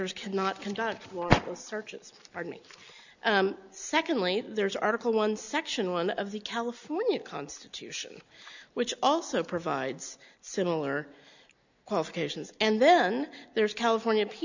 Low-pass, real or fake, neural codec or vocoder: 7.2 kHz; real; none